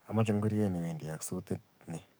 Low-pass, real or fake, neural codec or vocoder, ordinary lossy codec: none; fake; codec, 44.1 kHz, 7.8 kbps, DAC; none